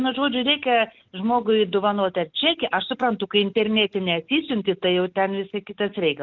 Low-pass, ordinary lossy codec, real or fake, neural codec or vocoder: 7.2 kHz; Opus, 24 kbps; real; none